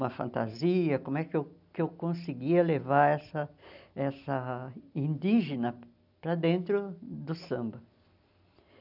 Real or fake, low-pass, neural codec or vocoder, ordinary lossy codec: real; 5.4 kHz; none; none